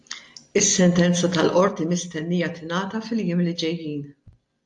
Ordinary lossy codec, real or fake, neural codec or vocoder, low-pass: AAC, 64 kbps; real; none; 10.8 kHz